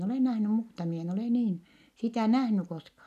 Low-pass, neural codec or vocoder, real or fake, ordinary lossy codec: 14.4 kHz; none; real; none